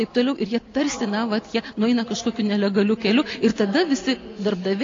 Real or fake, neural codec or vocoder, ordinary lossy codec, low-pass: real; none; AAC, 32 kbps; 7.2 kHz